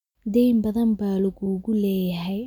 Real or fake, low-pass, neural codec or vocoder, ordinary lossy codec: real; 19.8 kHz; none; none